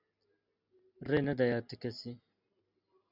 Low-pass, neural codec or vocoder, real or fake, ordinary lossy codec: 5.4 kHz; none; real; Opus, 64 kbps